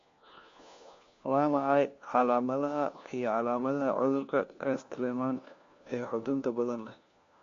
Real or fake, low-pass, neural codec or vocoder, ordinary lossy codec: fake; 7.2 kHz; codec, 16 kHz, 1 kbps, FunCodec, trained on LibriTTS, 50 frames a second; MP3, 48 kbps